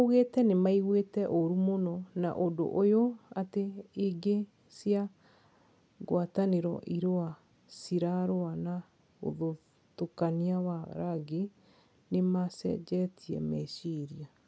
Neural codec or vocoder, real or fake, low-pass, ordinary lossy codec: none; real; none; none